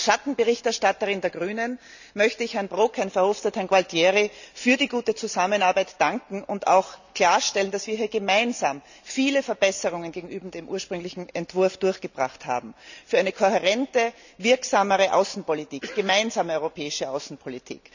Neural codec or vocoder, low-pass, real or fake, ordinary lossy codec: none; 7.2 kHz; real; none